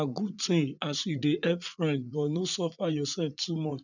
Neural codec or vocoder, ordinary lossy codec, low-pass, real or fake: vocoder, 44.1 kHz, 80 mel bands, Vocos; none; 7.2 kHz; fake